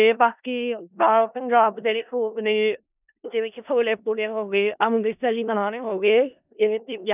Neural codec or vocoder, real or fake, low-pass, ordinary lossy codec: codec, 16 kHz in and 24 kHz out, 0.4 kbps, LongCat-Audio-Codec, four codebook decoder; fake; 3.6 kHz; none